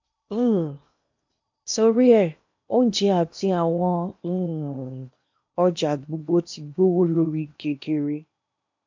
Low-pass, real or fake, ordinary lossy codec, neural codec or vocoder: 7.2 kHz; fake; MP3, 64 kbps; codec, 16 kHz in and 24 kHz out, 0.8 kbps, FocalCodec, streaming, 65536 codes